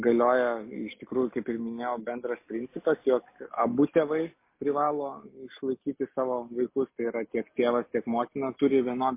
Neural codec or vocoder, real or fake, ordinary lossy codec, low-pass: none; real; MP3, 24 kbps; 3.6 kHz